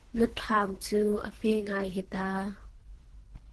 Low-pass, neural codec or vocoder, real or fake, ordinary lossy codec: 10.8 kHz; codec, 24 kHz, 3 kbps, HILCodec; fake; Opus, 16 kbps